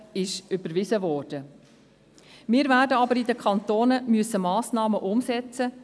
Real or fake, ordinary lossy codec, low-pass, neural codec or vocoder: real; none; none; none